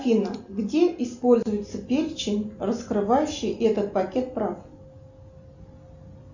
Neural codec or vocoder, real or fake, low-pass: none; real; 7.2 kHz